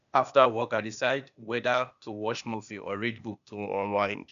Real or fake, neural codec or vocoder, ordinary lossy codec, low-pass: fake; codec, 16 kHz, 0.8 kbps, ZipCodec; none; 7.2 kHz